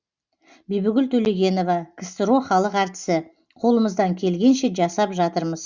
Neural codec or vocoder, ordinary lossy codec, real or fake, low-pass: none; Opus, 64 kbps; real; 7.2 kHz